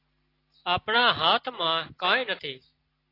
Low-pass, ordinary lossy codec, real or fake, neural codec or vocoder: 5.4 kHz; AAC, 32 kbps; real; none